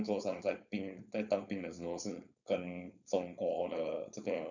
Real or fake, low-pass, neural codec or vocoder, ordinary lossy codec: fake; 7.2 kHz; codec, 16 kHz, 4.8 kbps, FACodec; none